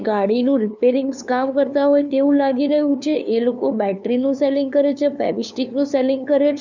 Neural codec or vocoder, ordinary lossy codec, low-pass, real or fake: codec, 16 kHz, 2 kbps, FunCodec, trained on LibriTTS, 25 frames a second; none; 7.2 kHz; fake